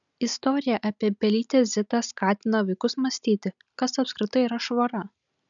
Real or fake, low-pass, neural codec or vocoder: real; 7.2 kHz; none